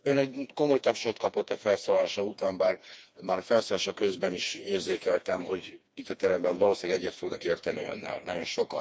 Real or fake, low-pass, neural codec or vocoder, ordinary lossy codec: fake; none; codec, 16 kHz, 2 kbps, FreqCodec, smaller model; none